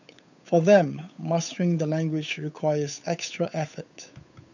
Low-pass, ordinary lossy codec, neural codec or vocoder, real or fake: 7.2 kHz; none; codec, 16 kHz, 8 kbps, FunCodec, trained on Chinese and English, 25 frames a second; fake